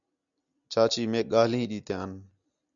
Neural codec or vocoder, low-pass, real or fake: none; 7.2 kHz; real